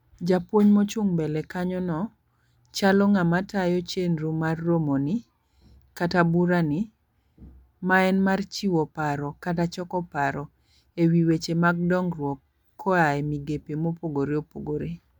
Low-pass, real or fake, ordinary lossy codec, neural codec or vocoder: 19.8 kHz; real; MP3, 96 kbps; none